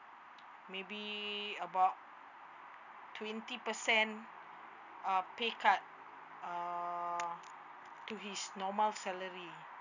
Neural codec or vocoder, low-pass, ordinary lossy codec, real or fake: none; 7.2 kHz; none; real